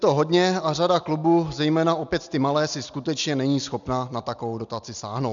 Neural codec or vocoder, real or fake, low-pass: none; real; 7.2 kHz